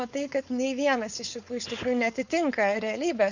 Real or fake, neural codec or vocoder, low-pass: fake; codec, 16 kHz, 4.8 kbps, FACodec; 7.2 kHz